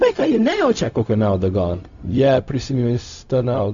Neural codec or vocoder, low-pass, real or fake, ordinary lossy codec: codec, 16 kHz, 0.4 kbps, LongCat-Audio-Codec; 7.2 kHz; fake; MP3, 64 kbps